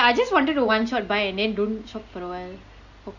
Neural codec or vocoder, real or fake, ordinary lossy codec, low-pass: none; real; Opus, 64 kbps; 7.2 kHz